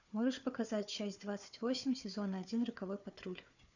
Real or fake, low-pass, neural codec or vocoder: fake; 7.2 kHz; codec, 16 kHz, 4 kbps, FunCodec, trained on Chinese and English, 50 frames a second